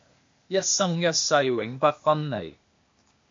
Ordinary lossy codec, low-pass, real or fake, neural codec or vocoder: MP3, 48 kbps; 7.2 kHz; fake; codec, 16 kHz, 0.8 kbps, ZipCodec